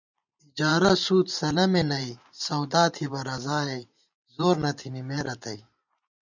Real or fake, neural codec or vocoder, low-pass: fake; vocoder, 44.1 kHz, 128 mel bands every 512 samples, BigVGAN v2; 7.2 kHz